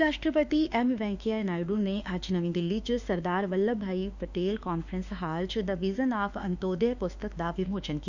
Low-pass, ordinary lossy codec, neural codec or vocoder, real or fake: 7.2 kHz; none; autoencoder, 48 kHz, 32 numbers a frame, DAC-VAE, trained on Japanese speech; fake